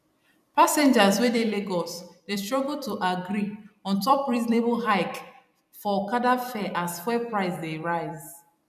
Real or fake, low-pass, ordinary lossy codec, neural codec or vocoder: fake; 14.4 kHz; none; vocoder, 44.1 kHz, 128 mel bands every 256 samples, BigVGAN v2